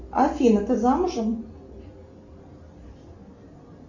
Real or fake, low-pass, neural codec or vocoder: real; 7.2 kHz; none